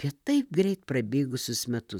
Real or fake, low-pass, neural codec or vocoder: fake; 19.8 kHz; vocoder, 44.1 kHz, 128 mel bands, Pupu-Vocoder